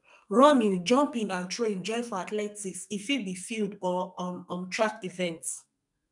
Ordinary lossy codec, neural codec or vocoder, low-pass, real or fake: none; codec, 44.1 kHz, 2.6 kbps, SNAC; 10.8 kHz; fake